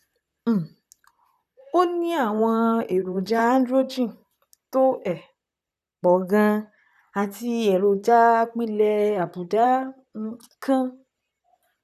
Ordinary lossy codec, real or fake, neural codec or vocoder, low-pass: none; fake; vocoder, 44.1 kHz, 128 mel bands, Pupu-Vocoder; 14.4 kHz